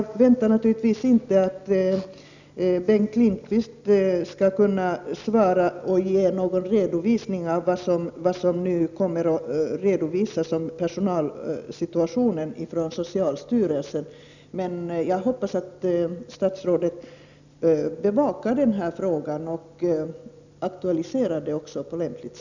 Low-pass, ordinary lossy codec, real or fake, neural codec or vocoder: 7.2 kHz; none; real; none